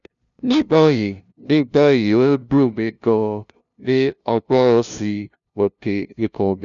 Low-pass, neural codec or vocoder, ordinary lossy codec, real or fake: 7.2 kHz; codec, 16 kHz, 0.5 kbps, FunCodec, trained on LibriTTS, 25 frames a second; none; fake